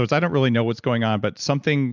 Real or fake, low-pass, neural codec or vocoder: real; 7.2 kHz; none